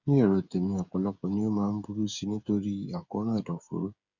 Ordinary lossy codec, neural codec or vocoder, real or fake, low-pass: none; codec, 16 kHz, 8 kbps, FreqCodec, smaller model; fake; 7.2 kHz